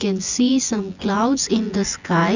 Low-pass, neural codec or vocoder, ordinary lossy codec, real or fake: 7.2 kHz; vocoder, 24 kHz, 100 mel bands, Vocos; none; fake